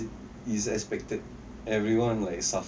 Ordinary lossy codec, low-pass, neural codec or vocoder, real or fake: none; none; none; real